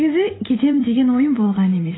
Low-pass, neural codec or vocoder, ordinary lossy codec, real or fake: 7.2 kHz; vocoder, 44.1 kHz, 128 mel bands every 512 samples, BigVGAN v2; AAC, 16 kbps; fake